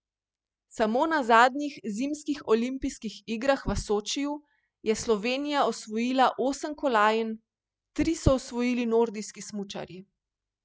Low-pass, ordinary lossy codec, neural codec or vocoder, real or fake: none; none; none; real